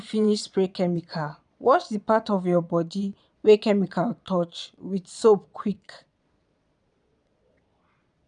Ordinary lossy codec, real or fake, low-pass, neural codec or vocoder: none; fake; 9.9 kHz; vocoder, 22.05 kHz, 80 mel bands, Vocos